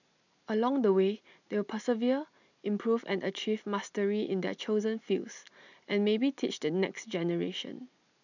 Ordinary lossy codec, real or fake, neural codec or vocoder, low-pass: none; real; none; 7.2 kHz